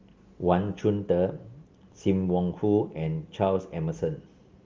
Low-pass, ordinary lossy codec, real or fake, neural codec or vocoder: 7.2 kHz; Opus, 32 kbps; real; none